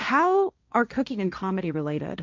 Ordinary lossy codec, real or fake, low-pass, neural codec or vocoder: MP3, 64 kbps; fake; 7.2 kHz; codec, 16 kHz, 1.1 kbps, Voila-Tokenizer